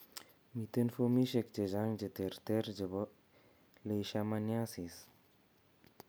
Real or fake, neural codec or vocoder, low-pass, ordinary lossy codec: real; none; none; none